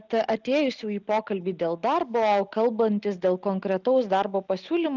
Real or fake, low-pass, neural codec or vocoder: real; 7.2 kHz; none